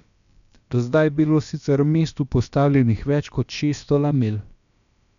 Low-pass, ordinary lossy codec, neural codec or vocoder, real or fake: 7.2 kHz; MP3, 96 kbps; codec, 16 kHz, about 1 kbps, DyCAST, with the encoder's durations; fake